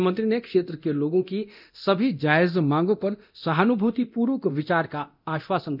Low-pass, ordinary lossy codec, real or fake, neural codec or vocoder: 5.4 kHz; none; fake; codec, 24 kHz, 0.9 kbps, DualCodec